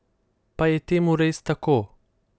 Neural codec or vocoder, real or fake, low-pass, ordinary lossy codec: none; real; none; none